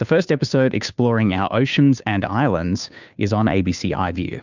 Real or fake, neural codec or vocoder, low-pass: fake; codec, 16 kHz, 2 kbps, FunCodec, trained on Chinese and English, 25 frames a second; 7.2 kHz